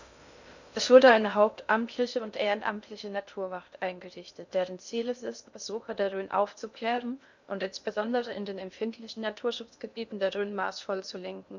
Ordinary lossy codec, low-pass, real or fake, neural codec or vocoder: none; 7.2 kHz; fake; codec, 16 kHz in and 24 kHz out, 0.6 kbps, FocalCodec, streaming, 2048 codes